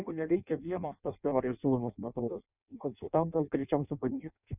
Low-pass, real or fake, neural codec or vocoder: 3.6 kHz; fake; codec, 16 kHz in and 24 kHz out, 0.6 kbps, FireRedTTS-2 codec